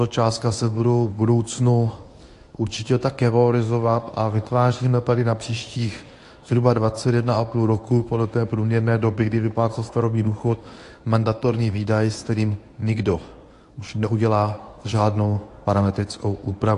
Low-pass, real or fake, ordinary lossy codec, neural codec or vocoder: 10.8 kHz; fake; AAC, 48 kbps; codec, 24 kHz, 0.9 kbps, WavTokenizer, medium speech release version 1